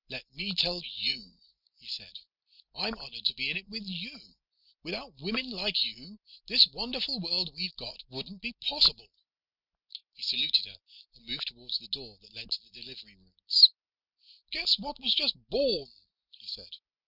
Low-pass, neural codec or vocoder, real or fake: 5.4 kHz; none; real